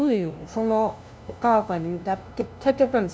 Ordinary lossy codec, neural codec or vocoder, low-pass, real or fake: none; codec, 16 kHz, 0.5 kbps, FunCodec, trained on LibriTTS, 25 frames a second; none; fake